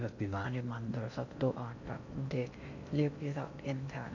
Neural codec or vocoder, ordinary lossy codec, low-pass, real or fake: codec, 16 kHz in and 24 kHz out, 0.6 kbps, FocalCodec, streaming, 4096 codes; none; 7.2 kHz; fake